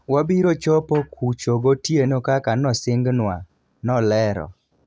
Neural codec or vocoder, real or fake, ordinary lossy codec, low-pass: none; real; none; none